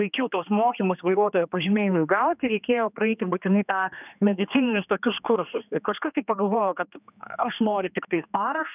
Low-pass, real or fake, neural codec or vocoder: 3.6 kHz; fake; codec, 16 kHz, 2 kbps, X-Codec, HuBERT features, trained on general audio